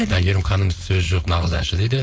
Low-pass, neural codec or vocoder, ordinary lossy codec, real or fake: none; codec, 16 kHz, 4.8 kbps, FACodec; none; fake